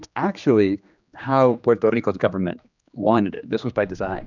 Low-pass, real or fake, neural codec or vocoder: 7.2 kHz; fake; codec, 16 kHz, 2 kbps, X-Codec, HuBERT features, trained on general audio